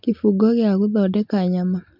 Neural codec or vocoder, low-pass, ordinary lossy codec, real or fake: none; 5.4 kHz; MP3, 48 kbps; real